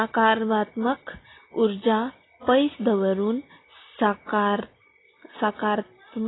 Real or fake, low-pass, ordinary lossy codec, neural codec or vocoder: real; 7.2 kHz; AAC, 16 kbps; none